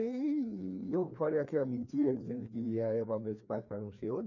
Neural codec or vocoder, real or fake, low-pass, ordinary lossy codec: codec, 16 kHz, 2 kbps, FreqCodec, larger model; fake; 7.2 kHz; none